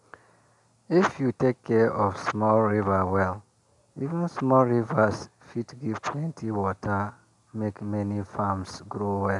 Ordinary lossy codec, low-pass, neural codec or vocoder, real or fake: none; 10.8 kHz; none; real